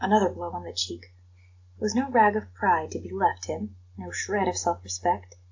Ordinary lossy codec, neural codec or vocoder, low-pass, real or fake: AAC, 48 kbps; none; 7.2 kHz; real